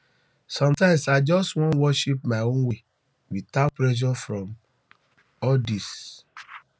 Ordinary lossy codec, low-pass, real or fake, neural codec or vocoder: none; none; real; none